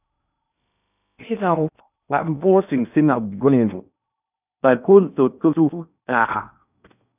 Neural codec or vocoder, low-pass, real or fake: codec, 16 kHz in and 24 kHz out, 0.6 kbps, FocalCodec, streaming, 2048 codes; 3.6 kHz; fake